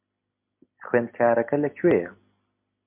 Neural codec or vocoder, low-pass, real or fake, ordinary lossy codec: none; 3.6 kHz; real; MP3, 32 kbps